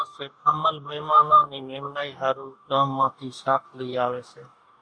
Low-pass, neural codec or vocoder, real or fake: 9.9 kHz; codec, 44.1 kHz, 2.6 kbps, DAC; fake